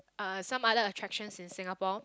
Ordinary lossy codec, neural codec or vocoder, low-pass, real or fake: none; none; none; real